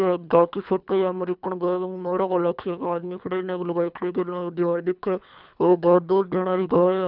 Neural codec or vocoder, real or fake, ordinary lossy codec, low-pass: codec, 24 kHz, 3 kbps, HILCodec; fake; none; 5.4 kHz